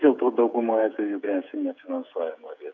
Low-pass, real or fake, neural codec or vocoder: 7.2 kHz; fake; codec, 16 kHz, 16 kbps, FreqCodec, smaller model